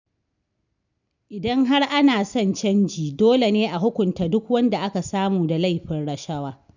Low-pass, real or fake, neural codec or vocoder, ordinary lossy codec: 7.2 kHz; real; none; none